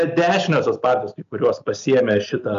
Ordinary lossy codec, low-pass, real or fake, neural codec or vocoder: MP3, 64 kbps; 7.2 kHz; real; none